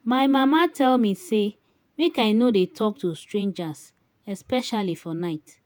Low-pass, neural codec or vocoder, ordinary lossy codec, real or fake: none; vocoder, 48 kHz, 128 mel bands, Vocos; none; fake